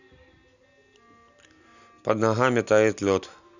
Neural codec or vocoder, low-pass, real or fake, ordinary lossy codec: none; 7.2 kHz; real; none